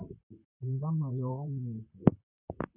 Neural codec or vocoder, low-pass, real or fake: vocoder, 44.1 kHz, 128 mel bands, Pupu-Vocoder; 3.6 kHz; fake